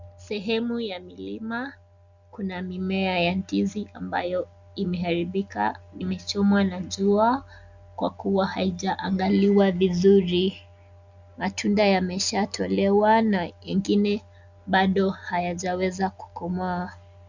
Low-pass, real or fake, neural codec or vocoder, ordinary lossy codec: 7.2 kHz; real; none; Opus, 64 kbps